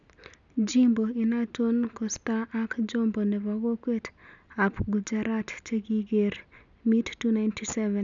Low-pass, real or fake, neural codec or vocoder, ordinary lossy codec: 7.2 kHz; real; none; none